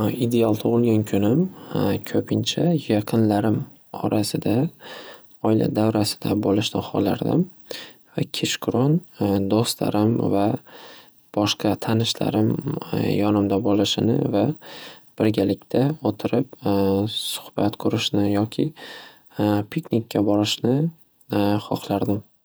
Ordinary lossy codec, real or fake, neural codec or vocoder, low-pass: none; fake; vocoder, 48 kHz, 128 mel bands, Vocos; none